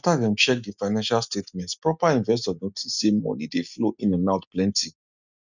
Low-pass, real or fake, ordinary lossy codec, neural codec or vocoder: 7.2 kHz; real; none; none